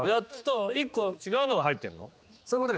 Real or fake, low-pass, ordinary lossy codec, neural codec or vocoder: fake; none; none; codec, 16 kHz, 2 kbps, X-Codec, HuBERT features, trained on general audio